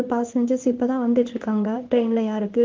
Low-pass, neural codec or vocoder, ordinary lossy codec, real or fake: 7.2 kHz; codec, 16 kHz in and 24 kHz out, 1 kbps, XY-Tokenizer; Opus, 32 kbps; fake